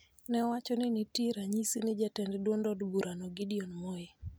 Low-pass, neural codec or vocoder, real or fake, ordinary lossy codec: none; none; real; none